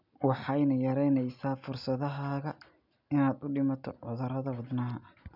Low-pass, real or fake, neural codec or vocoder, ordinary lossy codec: 5.4 kHz; real; none; none